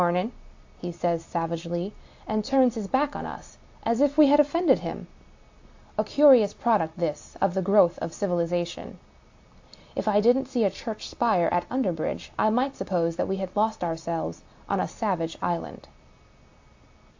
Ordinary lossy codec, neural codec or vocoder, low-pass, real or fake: AAC, 48 kbps; none; 7.2 kHz; real